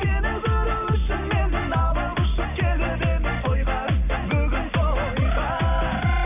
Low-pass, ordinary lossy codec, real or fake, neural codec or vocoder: 3.6 kHz; AAC, 32 kbps; real; none